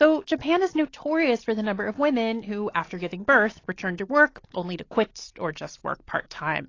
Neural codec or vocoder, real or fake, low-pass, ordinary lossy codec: none; real; 7.2 kHz; AAC, 32 kbps